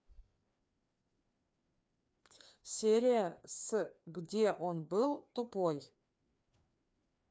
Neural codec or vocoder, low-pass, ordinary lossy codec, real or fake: codec, 16 kHz, 2 kbps, FreqCodec, larger model; none; none; fake